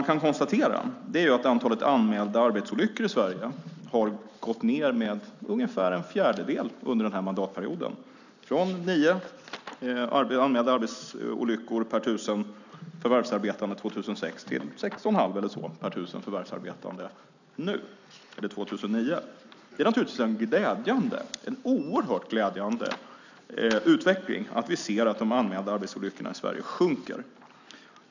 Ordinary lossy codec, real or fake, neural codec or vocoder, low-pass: none; real; none; 7.2 kHz